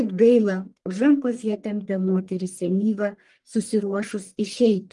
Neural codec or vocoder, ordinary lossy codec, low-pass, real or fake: codec, 44.1 kHz, 1.7 kbps, Pupu-Codec; Opus, 24 kbps; 10.8 kHz; fake